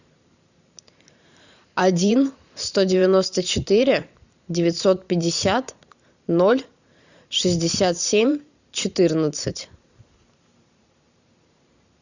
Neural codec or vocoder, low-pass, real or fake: vocoder, 44.1 kHz, 128 mel bands every 512 samples, BigVGAN v2; 7.2 kHz; fake